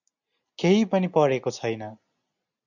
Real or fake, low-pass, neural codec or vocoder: real; 7.2 kHz; none